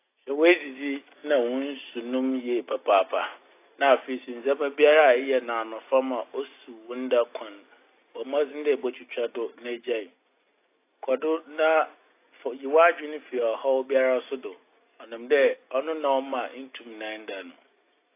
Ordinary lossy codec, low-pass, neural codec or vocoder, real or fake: AAC, 24 kbps; 3.6 kHz; none; real